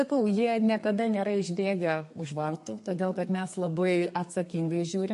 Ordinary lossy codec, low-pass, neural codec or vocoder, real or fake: MP3, 48 kbps; 14.4 kHz; codec, 32 kHz, 1.9 kbps, SNAC; fake